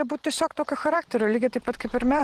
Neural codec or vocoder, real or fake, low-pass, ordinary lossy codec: vocoder, 44.1 kHz, 128 mel bands every 512 samples, BigVGAN v2; fake; 14.4 kHz; Opus, 16 kbps